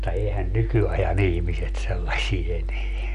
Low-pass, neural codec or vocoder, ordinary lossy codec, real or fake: 10.8 kHz; none; none; real